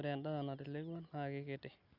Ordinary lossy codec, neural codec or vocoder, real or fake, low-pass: Opus, 64 kbps; none; real; 5.4 kHz